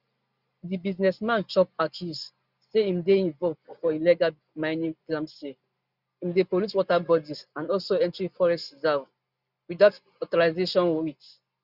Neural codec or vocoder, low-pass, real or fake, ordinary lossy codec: none; 5.4 kHz; real; Opus, 64 kbps